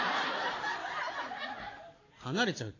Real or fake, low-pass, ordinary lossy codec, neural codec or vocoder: real; 7.2 kHz; AAC, 32 kbps; none